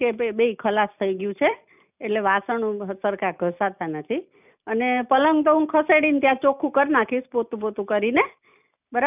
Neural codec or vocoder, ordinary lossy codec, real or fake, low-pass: none; none; real; 3.6 kHz